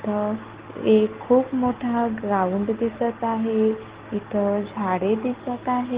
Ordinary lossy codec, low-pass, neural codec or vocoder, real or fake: Opus, 16 kbps; 3.6 kHz; none; real